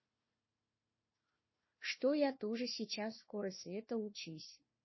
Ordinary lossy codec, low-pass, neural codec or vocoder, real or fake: MP3, 24 kbps; 7.2 kHz; codec, 16 kHz, 1 kbps, FunCodec, trained on Chinese and English, 50 frames a second; fake